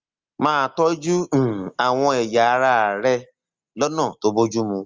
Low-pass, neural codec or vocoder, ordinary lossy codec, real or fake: 7.2 kHz; none; Opus, 32 kbps; real